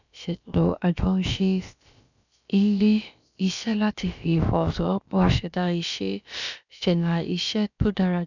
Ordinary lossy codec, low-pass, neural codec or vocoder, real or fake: none; 7.2 kHz; codec, 16 kHz, about 1 kbps, DyCAST, with the encoder's durations; fake